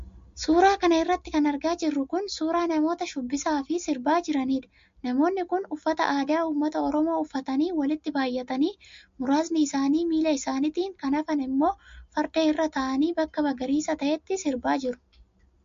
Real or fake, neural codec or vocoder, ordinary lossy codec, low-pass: real; none; MP3, 48 kbps; 7.2 kHz